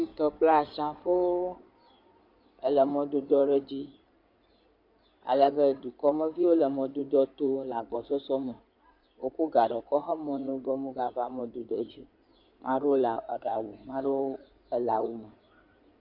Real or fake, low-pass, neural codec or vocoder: fake; 5.4 kHz; codec, 16 kHz in and 24 kHz out, 2.2 kbps, FireRedTTS-2 codec